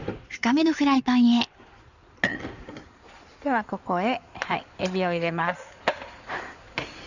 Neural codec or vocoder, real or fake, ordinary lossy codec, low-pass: codec, 16 kHz in and 24 kHz out, 2.2 kbps, FireRedTTS-2 codec; fake; none; 7.2 kHz